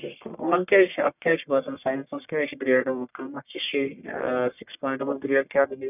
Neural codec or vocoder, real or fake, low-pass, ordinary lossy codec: codec, 44.1 kHz, 1.7 kbps, Pupu-Codec; fake; 3.6 kHz; none